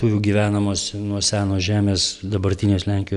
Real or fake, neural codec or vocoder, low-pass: real; none; 10.8 kHz